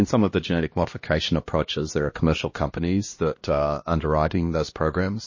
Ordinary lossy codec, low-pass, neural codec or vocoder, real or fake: MP3, 32 kbps; 7.2 kHz; codec, 16 kHz, 1 kbps, X-Codec, HuBERT features, trained on LibriSpeech; fake